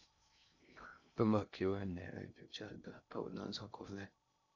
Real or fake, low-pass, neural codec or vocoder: fake; 7.2 kHz; codec, 16 kHz in and 24 kHz out, 0.6 kbps, FocalCodec, streaming, 2048 codes